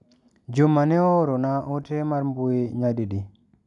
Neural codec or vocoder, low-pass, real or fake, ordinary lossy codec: none; 10.8 kHz; real; none